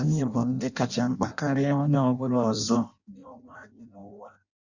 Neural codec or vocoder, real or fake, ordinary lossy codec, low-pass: codec, 16 kHz in and 24 kHz out, 0.6 kbps, FireRedTTS-2 codec; fake; none; 7.2 kHz